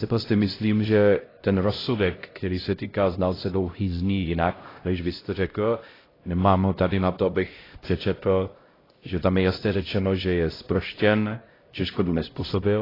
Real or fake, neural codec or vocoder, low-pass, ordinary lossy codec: fake; codec, 16 kHz, 0.5 kbps, X-Codec, HuBERT features, trained on LibriSpeech; 5.4 kHz; AAC, 24 kbps